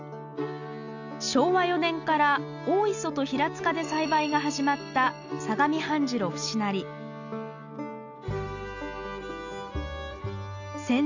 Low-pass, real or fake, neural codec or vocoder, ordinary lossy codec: 7.2 kHz; real; none; none